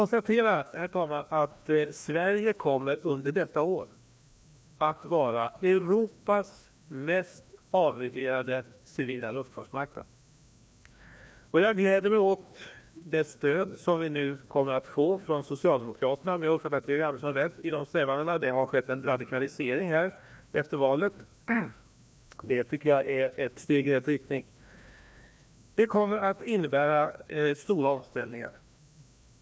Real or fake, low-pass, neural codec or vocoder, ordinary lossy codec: fake; none; codec, 16 kHz, 1 kbps, FreqCodec, larger model; none